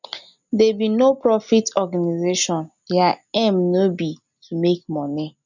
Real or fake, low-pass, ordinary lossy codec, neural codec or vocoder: real; 7.2 kHz; none; none